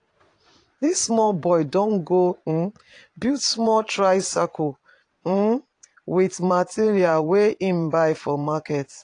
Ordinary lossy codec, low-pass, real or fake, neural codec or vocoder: AAC, 48 kbps; 9.9 kHz; fake; vocoder, 22.05 kHz, 80 mel bands, Vocos